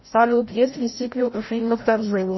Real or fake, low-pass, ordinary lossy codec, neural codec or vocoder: fake; 7.2 kHz; MP3, 24 kbps; codec, 16 kHz, 0.5 kbps, FreqCodec, larger model